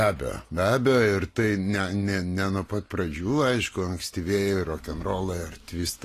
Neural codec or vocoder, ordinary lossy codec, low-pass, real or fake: none; AAC, 48 kbps; 14.4 kHz; real